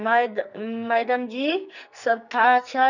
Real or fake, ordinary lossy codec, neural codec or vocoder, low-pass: fake; none; codec, 44.1 kHz, 2.6 kbps, SNAC; 7.2 kHz